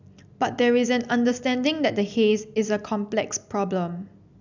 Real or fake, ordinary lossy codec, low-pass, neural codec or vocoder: real; none; 7.2 kHz; none